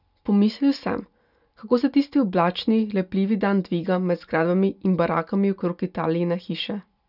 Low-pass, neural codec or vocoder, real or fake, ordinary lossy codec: 5.4 kHz; none; real; none